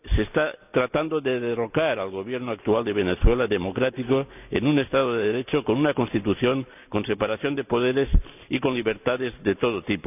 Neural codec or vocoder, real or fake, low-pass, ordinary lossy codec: none; real; 3.6 kHz; none